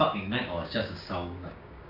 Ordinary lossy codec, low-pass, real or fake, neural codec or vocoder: AAC, 48 kbps; 5.4 kHz; fake; codec, 16 kHz, 6 kbps, DAC